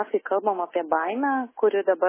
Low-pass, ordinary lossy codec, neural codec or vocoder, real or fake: 3.6 kHz; MP3, 16 kbps; none; real